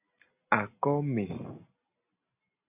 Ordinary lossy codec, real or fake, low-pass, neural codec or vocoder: AAC, 24 kbps; real; 3.6 kHz; none